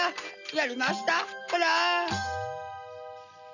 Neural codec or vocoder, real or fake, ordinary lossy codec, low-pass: none; real; none; 7.2 kHz